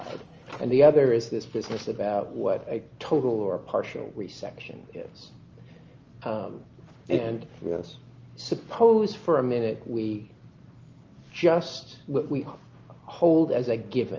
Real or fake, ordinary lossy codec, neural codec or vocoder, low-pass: real; Opus, 24 kbps; none; 7.2 kHz